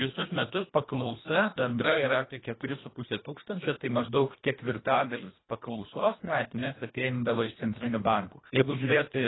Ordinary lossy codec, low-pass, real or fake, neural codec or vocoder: AAC, 16 kbps; 7.2 kHz; fake; codec, 24 kHz, 1.5 kbps, HILCodec